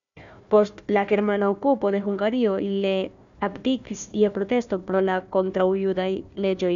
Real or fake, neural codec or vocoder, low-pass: fake; codec, 16 kHz, 1 kbps, FunCodec, trained on Chinese and English, 50 frames a second; 7.2 kHz